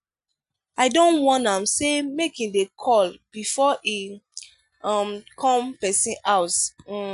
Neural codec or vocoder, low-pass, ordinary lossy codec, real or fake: none; 10.8 kHz; none; real